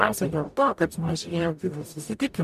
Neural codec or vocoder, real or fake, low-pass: codec, 44.1 kHz, 0.9 kbps, DAC; fake; 14.4 kHz